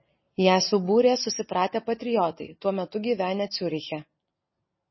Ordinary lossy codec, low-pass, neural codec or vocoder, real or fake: MP3, 24 kbps; 7.2 kHz; none; real